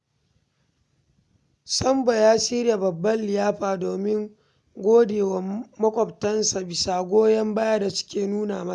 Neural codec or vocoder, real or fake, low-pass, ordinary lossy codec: none; real; none; none